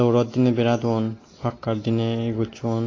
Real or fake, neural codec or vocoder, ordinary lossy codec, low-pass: real; none; AAC, 32 kbps; 7.2 kHz